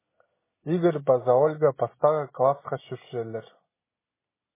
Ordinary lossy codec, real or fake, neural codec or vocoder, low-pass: MP3, 16 kbps; real; none; 3.6 kHz